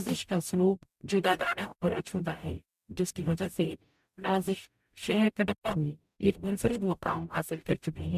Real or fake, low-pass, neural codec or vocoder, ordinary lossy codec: fake; 14.4 kHz; codec, 44.1 kHz, 0.9 kbps, DAC; MP3, 96 kbps